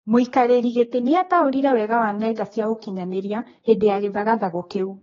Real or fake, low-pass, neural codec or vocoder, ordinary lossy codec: fake; 7.2 kHz; codec, 16 kHz, 2 kbps, X-Codec, HuBERT features, trained on general audio; AAC, 24 kbps